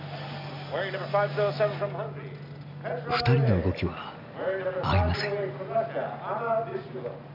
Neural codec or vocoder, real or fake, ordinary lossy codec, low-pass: none; real; none; 5.4 kHz